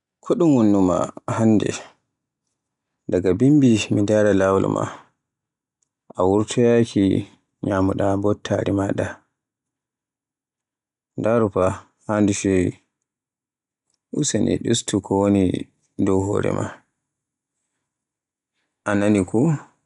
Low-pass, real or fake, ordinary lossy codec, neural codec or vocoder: 10.8 kHz; real; none; none